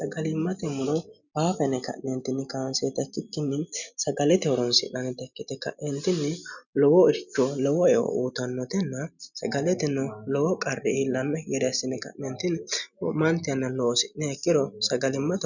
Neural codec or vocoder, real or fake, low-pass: none; real; 7.2 kHz